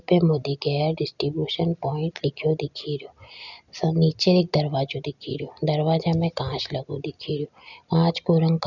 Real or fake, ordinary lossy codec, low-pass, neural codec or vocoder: real; none; 7.2 kHz; none